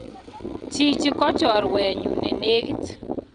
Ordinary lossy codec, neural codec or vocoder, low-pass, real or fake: none; vocoder, 22.05 kHz, 80 mel bands, WaveNeXt; 9.9 kHz; fake